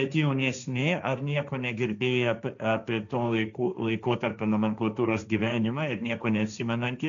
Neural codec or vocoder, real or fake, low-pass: codec, 16 kHz, 1.1 kbps, Voila-Tokenizer; fake; 7.2 kHz